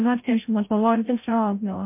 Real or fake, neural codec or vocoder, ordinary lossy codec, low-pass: fake; codec, 16 kHz, 0.5 kbps, FreqCodec, larger model; MP3, 24 kbps; 3.6 kHz